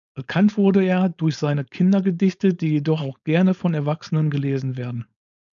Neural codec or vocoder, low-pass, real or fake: codec, 16 kHz, 4.8 kbps, FACodec; 7.2 kHz; fake